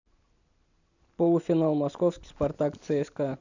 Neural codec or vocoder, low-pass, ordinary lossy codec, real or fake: none; 7.2 kHz; none; real